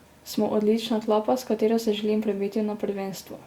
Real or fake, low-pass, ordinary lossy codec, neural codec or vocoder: real; 19.8 kHz; Opus, 64 kbps; none